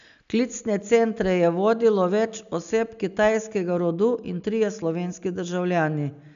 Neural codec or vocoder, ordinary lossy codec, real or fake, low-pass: none; none; real; 7.2 kHz